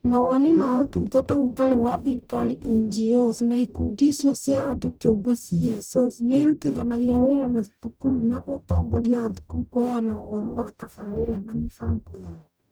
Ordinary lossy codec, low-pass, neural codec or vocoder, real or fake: none; none; codec, 44.1 kHz, 0.9 kbps, DAC; fake